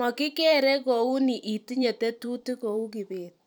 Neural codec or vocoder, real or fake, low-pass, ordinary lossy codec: vocoder, 44.1 kHz, 128 mel bands every 512 samples, BigVGAN v2; fake; none; none